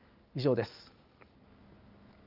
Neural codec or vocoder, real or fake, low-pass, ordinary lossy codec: none; real; 5.4 kHz; Opus, 32 kbps